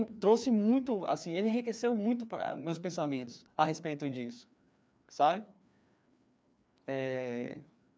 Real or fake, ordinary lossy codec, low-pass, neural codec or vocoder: fake; none; none; codec, 16 kHz, 2 kbps, FreqCodec, larger model